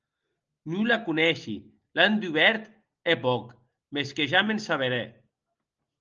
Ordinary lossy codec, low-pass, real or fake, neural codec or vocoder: Opus, 24 kbps; 7.2 kHz; real; none